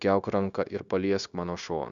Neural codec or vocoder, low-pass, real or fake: codec, 16 kHz, 0.9 kbps, LongCat-Audio-Codec; 7.2 kHz; fake